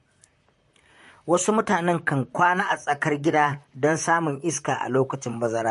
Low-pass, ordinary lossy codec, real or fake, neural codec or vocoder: 14.4 kHz; MP3, 48 kbps; fake; vocoder, 44.1 kHz, 128 mel bands, Pupu-Vocoder